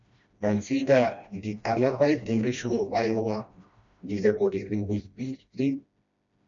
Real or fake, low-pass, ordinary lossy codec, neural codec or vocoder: fake; 7.2 kHz; MP3, 64 kbps; codec, 16 kHz, 1 kbps, FreqCodec, smaller model